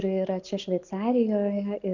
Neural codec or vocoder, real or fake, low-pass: none; real; 7.2 kHz